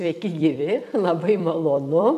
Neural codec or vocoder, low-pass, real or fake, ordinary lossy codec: vocoder, 44.1 kHz, 128 mel bands every 256 samples, BigVGAN v2; 14.4 kHz; fake; MP3, 96 kbps